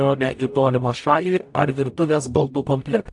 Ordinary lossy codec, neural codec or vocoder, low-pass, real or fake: AAC, 64 kbps; codec, 44.1 kHz, 0.9 kbps, DAC; 10.8 kHz; fake